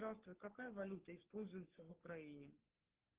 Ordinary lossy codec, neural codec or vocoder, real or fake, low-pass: Opus, 16 kbps; codec, 44.1 kHz, 3.4 kbps, Pupu-Codec; fake; 3.6 kHz